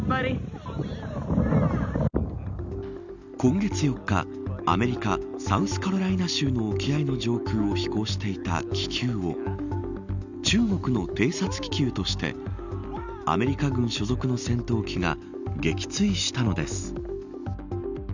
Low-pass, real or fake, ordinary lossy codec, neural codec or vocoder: 7.2 kHz; real; none; none